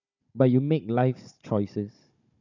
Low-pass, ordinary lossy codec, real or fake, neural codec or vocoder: 7.2 kHz; none; fake; codec, 16 kHz, 16 kbps, FunCodec, trained on Chinese and English, 50 frames a second